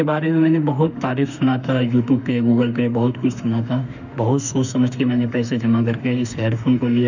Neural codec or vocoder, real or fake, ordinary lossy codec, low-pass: autoencoder, 48 kHz, 32 numbers a frame, DAC-VAE, trained on Japanese speech; fake; none; 7.2 kHz